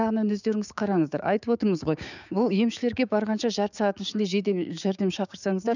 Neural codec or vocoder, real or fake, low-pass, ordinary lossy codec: codec, 16 kHz, 6 kbps, DAC; fake; 7.2 kHz; none